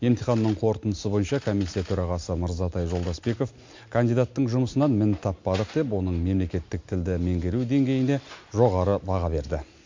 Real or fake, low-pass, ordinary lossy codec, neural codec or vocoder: real; 7.2 kHz; MP3, 48 kbps; none